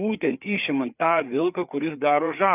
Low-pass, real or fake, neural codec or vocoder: 3.6 kHz; fake; codec, 16 kHz, 4 kbps, FreqCodec, larger model